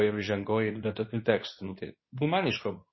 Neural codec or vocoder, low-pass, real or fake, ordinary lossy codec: codec, 24 kHz, 0.9 kbps, WavTokenizer, medium speech release version 2; 7.2 kHz; fake; MP3, 24 kbps